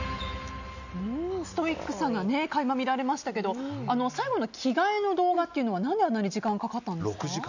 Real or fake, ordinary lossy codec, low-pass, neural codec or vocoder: real; none; 7.2 kHz; none